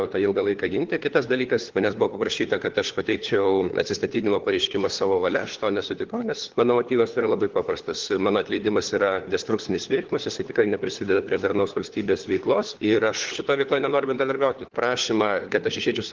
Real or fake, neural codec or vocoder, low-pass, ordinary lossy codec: fake; codec, 16 kHz, 4 kbps, FunCodec, trained on LibriTTS, 50 frames a second; 7.2 kHz; Opus, 16 kbps